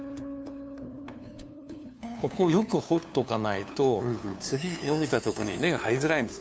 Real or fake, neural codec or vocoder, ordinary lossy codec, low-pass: fake; codec, 16 kHz, 2 kbps, FunCodec, trained on LibriTTS, 25 frames a second; none; none